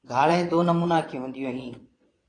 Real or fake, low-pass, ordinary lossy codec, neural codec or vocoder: fake; 9.9 kHz; AAC, 32 kbps; vocoder, 22.05 kHz, 80 mel bands, Vocos